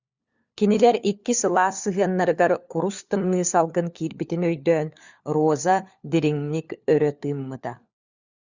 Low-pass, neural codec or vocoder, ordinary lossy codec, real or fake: 7.2 kHz; codec, 16 kHz, 4 kbps, FunCodec, trained on LibriTTS, 50 frames a second; Opus, 64 kbps; fake